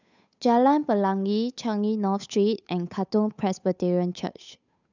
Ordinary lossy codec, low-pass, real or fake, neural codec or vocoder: none; 7.2 kHz; fake; codec, 16 kHz, 8 kbps, FunCodec, trained on Chinese and English, 25 frames a second